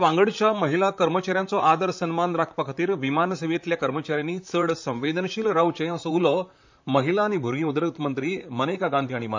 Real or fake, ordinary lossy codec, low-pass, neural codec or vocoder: fake; MP3, 64 kbps; 7.2 kHz; vocoder, 44.1 kHz, 128 mel bands, Pupu-Vocoder